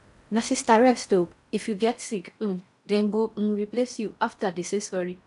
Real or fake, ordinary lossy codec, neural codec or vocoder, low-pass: fake; none; codec, 16 kHz in and 24 kHz out, 0.6 kbps, FocalCodec, streaming, 4096 codes; 10.8 kHz